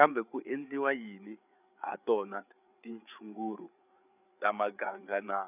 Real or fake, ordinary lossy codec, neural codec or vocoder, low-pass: fake; none; codec, 16 kHz, 8 kbps, FreqCodec, larger model; 3.6 kHz